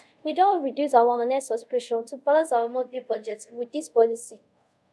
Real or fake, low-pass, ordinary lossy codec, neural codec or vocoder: fake; none; none; codec, 24 kHz, 0.5 kbps, DualCodec